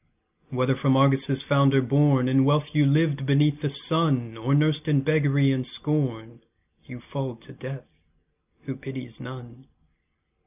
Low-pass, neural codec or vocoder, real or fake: 3.6 kHz; none; real